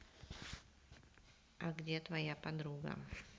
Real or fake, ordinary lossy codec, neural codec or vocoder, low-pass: real; none; none; none